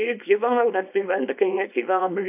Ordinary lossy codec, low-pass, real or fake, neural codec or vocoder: AAC, 32 kbps; 3.6 kHz; fake; codec, 24 kHz, 0.9 kbps, WavTokenizer, small release